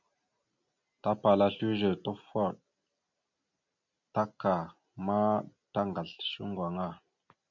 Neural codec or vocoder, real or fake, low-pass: none; real; 7.2 kHz